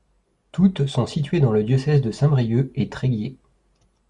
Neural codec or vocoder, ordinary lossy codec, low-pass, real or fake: none; Opus, 64 kbps; 10.8 kHz; real